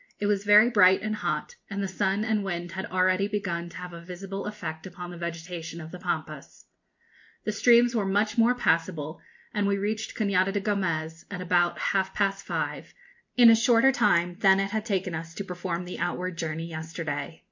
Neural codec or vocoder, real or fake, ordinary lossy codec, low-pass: none; real; MP3, 48 kbps; 7.2 kHz